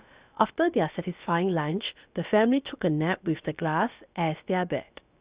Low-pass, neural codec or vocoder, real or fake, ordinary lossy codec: 3.6 kHz; codec, 16 kHz, about 1 kbps, DyCAST, with the encoder's durations; fake; Opus, 64 kbps